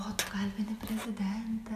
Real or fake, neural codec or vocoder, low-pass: real; none; 14.4 kHz